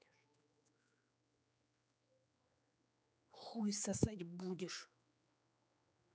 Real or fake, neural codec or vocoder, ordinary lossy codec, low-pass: fake; codec, 16 kHz, 4 kbps, X-Codec, HuBERT features, trained on general audio; none; none